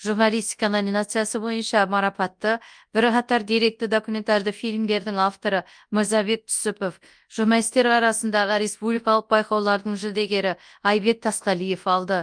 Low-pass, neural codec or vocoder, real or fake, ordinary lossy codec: 9.9 kHz; codec, 24 kHz, 0.9 kbps, WavTokenizer, large speech release; fake; Opus, 32 kbps